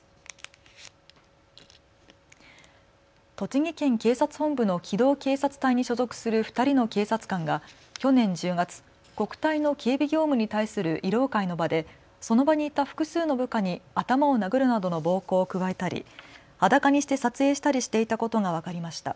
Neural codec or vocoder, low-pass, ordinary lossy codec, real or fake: none; none; none; real